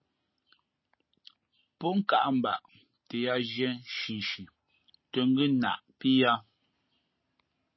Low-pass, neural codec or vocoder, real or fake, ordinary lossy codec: 7.2 kHz; none; real; MP3, 24 kbps